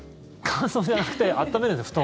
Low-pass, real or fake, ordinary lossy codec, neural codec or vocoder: none; real; none; none